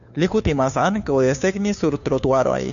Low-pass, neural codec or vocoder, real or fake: 7.2 kHz; codec, 16 kHz, 2 kbps, FunCodec, trained on Chinese and English, 25 frames a second; fake